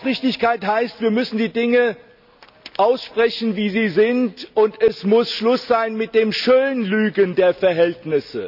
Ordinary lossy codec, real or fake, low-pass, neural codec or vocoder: none; real; 5.4 kHz; none